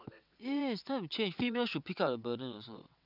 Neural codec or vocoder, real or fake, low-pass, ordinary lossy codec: vocoder, 22.05 kHz, 80 mel bands, WaveNeXt; fake; 5.4 kHz; none